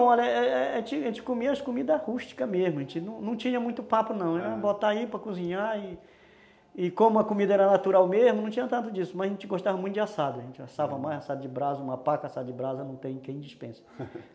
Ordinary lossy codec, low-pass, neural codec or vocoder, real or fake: none; none; none; real